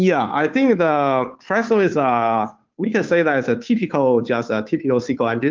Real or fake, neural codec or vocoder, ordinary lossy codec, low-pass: fake; codec, 16 kHz, 2 kbps, FunCodec, trained on Chinese and English, 25 frames a second; Opus, 32 kbps; 7.2 kHz